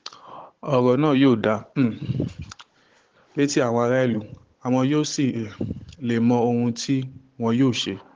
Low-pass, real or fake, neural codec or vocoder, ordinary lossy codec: 7.2 kHz; fake; codec, 16 kHz, 16 kbps, FunCodec, trained on Chinese and English, 50 frames a second; Opus, 16 kbps